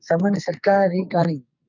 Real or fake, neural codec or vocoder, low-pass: fake; codec, 44.1 kHz, 2.6 kbps, SNAC; 7.2 kHz